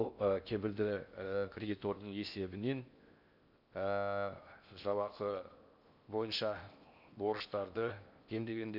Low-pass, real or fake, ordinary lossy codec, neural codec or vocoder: 5.4 kHz; fake; Opus, 64 kbps; codec, 16 kHz in and 24 kHz out, 0.6 kbps, FocalCodec, streaming, 4096 codes